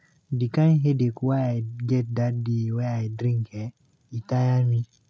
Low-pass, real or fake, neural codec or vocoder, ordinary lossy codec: none; real; none; none